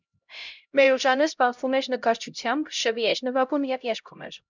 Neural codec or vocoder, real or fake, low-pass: codec, 16 kHz, 0.5 kbps, X-Codec, HuBERT features, trained on LibriSpeech; fake; 7.2 kHz